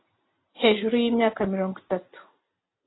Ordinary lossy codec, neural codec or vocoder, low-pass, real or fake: AAC, 16 kbps; none; 7.2 kHz; real